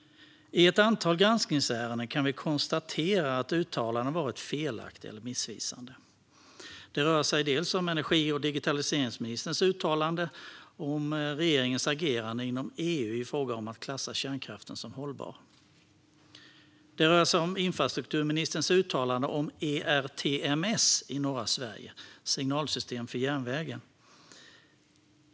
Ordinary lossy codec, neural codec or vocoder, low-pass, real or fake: none; none; none; real